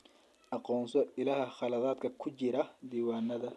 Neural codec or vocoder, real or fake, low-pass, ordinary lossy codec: none; real; none; none